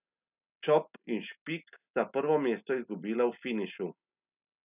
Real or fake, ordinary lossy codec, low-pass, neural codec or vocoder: real; none; 3.6 kHz; none